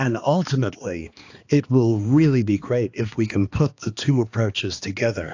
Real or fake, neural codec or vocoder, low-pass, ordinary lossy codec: fake; codec, 16 kHz, 2 kbps, X-Codec, HuBERT features, trained on balanced general audio; 7.2 kHz; AAC, 48 kbps